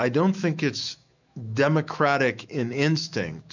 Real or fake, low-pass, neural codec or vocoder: fake; 7.2 kHz; autoencoder, 48 kHz, 128 numbers a frame, DAC-VAE, trained on Japanese speech